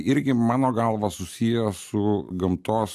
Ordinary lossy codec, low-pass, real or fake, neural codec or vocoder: MP3, 96 kbps; 14.4 kHz; real; none